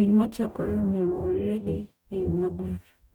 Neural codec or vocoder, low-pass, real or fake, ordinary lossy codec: codec, 44.1 kHz, 0.9 kbps, DAC; 19.8 kHz; fake; none